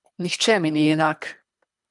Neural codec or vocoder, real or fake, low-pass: codec, 24 kHz, 3 kbps, HILCodec; fake; 10.8 kHz